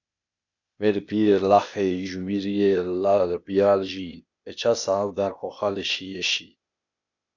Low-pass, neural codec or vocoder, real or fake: 7.2 kHz; codec, 16 kHz, 0.8 kbps, ZipCodec; fake